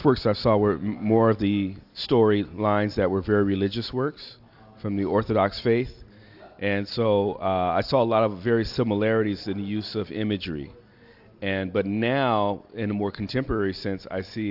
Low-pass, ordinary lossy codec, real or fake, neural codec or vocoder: 5.4 kHz; AAC, 48 kbps; real; none